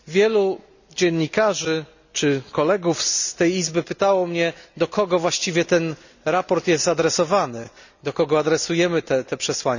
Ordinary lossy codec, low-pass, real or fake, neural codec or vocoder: none; 7.2 kHz; real; none